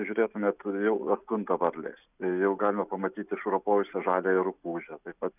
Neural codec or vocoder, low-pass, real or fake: none; 3.6 kHz; real